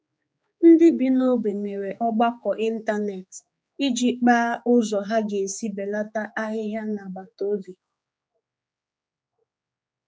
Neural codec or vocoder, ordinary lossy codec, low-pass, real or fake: codec, 16 kHz, 4 kbps, X-Codec, HuBERT features, trained on general audio; none; none; fake